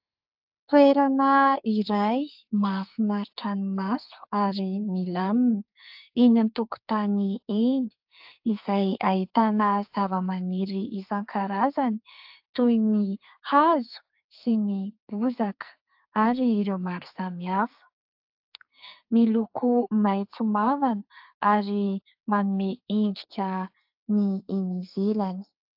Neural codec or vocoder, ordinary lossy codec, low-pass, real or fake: codec, 44.1 kHz, 2.6 kbps, SNAC; AAC, 48 kbps; 5.4 kHz; fake